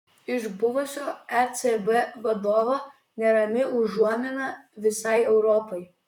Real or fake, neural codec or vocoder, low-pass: fake; vocoder, 44.1 kHz, 128 mel bands, Pupu-Vocoder; 19.8 kHz